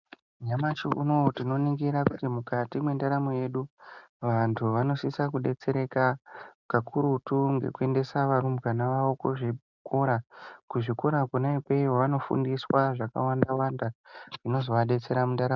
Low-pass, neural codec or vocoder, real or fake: 7.2 kHz; none; real